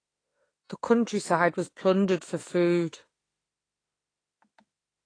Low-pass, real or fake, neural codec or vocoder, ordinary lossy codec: 9.9 kHz; fake; autoencoder, 48 kHz, 32 numbers a frame, DAC-VAE, trained on Japanese speech; AAC, 32 kbps